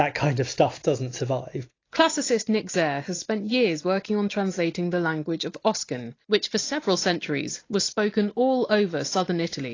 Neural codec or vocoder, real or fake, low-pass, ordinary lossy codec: none; real; 7.2 kHz; AAC, 32 kbps